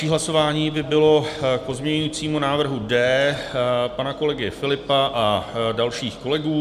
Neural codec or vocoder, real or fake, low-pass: none; real; 14.4 kHz